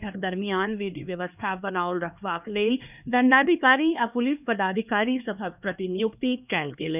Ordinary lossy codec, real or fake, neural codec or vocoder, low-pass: none; fake; codec, 16 kHz, 2 kbps, X-Codec, HuBERT features, trained on LibriSpeech; 3.6 kHz